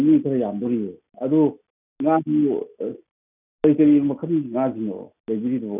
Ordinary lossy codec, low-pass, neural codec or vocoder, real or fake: none; 3.6 kHz; none; real